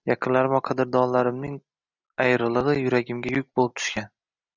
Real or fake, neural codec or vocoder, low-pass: real; none; 7.2 kHz